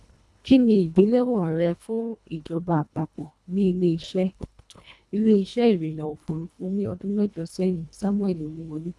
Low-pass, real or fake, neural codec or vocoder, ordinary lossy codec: none; fake; codec, 24 kHz, 1.5 kbps, HILCodec; none